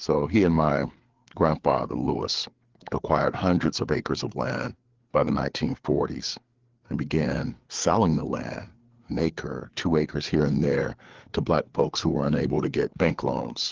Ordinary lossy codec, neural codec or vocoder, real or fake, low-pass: Opus, 16 kbps; codec, 16 kHz, 4 kbps, FreqCodec, larger model; fake; 7.2 kHz